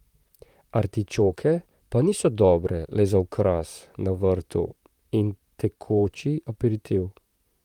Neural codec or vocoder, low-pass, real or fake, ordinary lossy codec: vocoder, 44.1 kHz, 128 mel bands, Pupu-Vocoder; 19.8 kHz; fake; Opus, 32 kbps